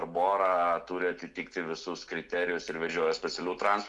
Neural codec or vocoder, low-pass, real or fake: none; 10.8 kHz; real